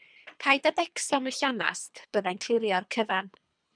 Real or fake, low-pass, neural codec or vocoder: fake; 9.9 kHz; codec, 24 kHz, 3 kbps, HILCodec